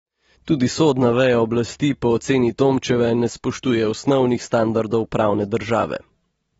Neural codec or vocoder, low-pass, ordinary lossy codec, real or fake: none; 19.8 kHz; AAC, 24 kbps; real